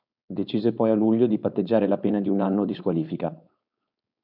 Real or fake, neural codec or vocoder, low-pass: fake; codec, 16 kHz, 4.8 kbps, FACodec; 5.4 kHz